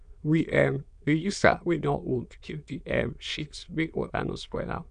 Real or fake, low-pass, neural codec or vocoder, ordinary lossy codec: fake; 9.9 kHz; autoencoder, 22.05 kHz, a latent of 192 numbers a frame, VITS, trained on many speakers; none